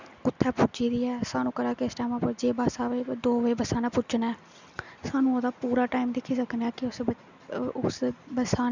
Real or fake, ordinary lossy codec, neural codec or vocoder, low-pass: real; none; none; 7.2 kHz